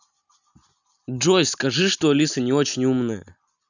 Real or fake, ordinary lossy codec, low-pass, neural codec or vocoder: real; none; 7.2 kHz; none